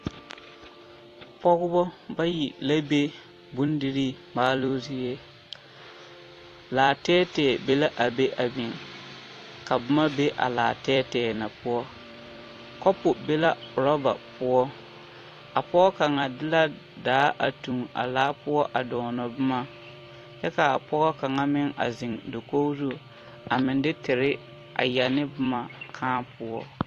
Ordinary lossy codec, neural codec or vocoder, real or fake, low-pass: AAC, 48 kbps; vocoder, 44.1 kHz, 128 mel bands every 256 samples, BigVGAN v2; fake; 14.4 kHz